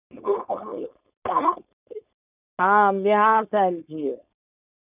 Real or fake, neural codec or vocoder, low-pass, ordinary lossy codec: fake; codec, 16 kHz, 4.8 kbps, FACodec; 3.6 kHz; none